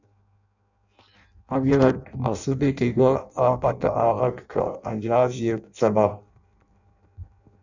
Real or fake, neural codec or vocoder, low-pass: fake; codec, 16 kHz in and 24 kHz out, 0.6 kbps, FireRedTTS-2 codec; 7.2 kHz